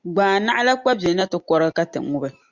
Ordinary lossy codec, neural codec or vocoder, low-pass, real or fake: Opus, 64 kbps; none; 7.2 kHz; real